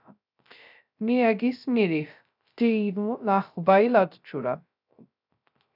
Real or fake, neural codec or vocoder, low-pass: fake; codec, 16 kHz, 0.3 kbps, FocalCodec; 5.4 kHz